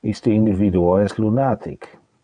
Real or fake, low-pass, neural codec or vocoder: fake; 9.9 kHz; vocoder, 22.05 kHz, 80 mel bands, WaveNeXt